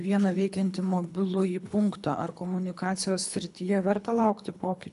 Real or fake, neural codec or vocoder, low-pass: fake; codec, 24 kHz, 3 kbps, HILCodec; 10.8 kHz